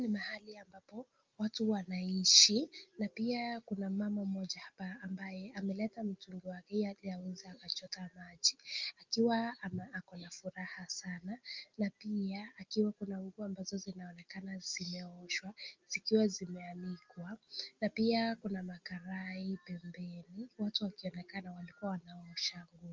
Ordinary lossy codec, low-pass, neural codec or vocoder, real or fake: Opus, 32 kbps; 7.2 kHz; none; real